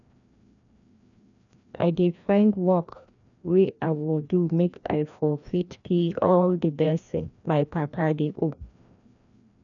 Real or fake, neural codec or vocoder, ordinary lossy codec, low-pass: fake; codec, 16 kHz, 1 kbps, FreqCodec, larger model; none; 7.2 kHz